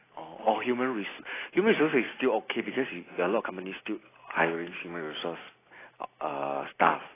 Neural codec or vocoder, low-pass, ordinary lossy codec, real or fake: none; 3.6 kHz; AAC, 16 kbps; real